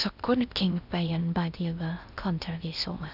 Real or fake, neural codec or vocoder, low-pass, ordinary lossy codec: fake; codec, 16 kHz in and 24 kHz out, 0.6 kbps, FocalCodec, streaming, 2048 codes; 5.4 kHz; none